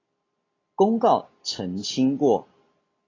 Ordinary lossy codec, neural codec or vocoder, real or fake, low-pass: AAC, 32 kbps; none; real; 7.2 kHz